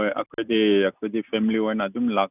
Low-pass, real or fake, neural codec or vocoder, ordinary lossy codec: 3.6 kHz; real; none; none